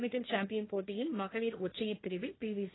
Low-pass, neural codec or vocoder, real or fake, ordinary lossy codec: 7.2 kHz; codec, 16 kHz, 1.1 kbps, Voila-Tokenizer; fake; AAC, 16 kbps